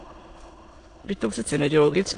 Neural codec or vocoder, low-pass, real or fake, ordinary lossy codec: autoencoder, 22.05 kHz, a latent of 192 numbers a frame, VITS, trained on many speakers; 9.9 kHz; fake; AAC, 48 kbps